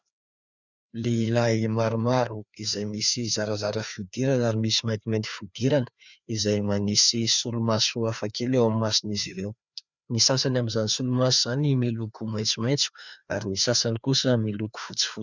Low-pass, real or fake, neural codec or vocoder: 7.2 kHz; fake; codec, 16 kHz, 2 kbps, FreqCodec, larger model